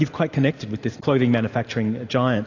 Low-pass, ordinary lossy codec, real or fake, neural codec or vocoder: 7.2 kHz; AAC, 48 kbps; real; none